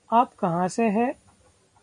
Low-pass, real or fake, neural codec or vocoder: 10.8 kHz; real; none